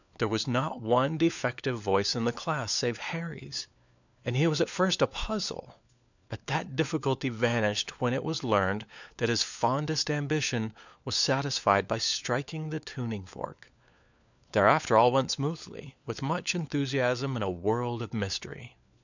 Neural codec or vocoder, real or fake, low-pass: codec, 16 kHz, 4 kbps, FunCodec, trained on LibriTTS, 50 frames a second; fake; 7.2 kHz